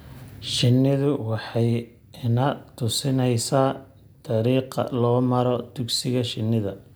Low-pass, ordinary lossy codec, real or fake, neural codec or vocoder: none; none; real; none